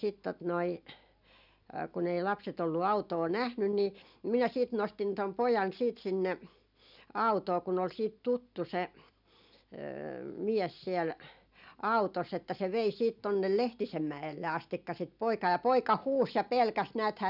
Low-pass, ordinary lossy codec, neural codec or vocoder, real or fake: 5.4 kHz; none; none; real